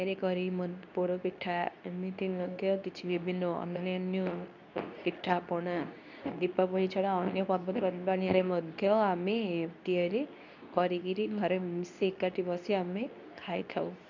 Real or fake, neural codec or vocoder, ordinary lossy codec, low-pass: fake; codec, 24 kHz, 0.9 kbps, WavTokenizer, medium speech release version 2; none; 7.2 kHz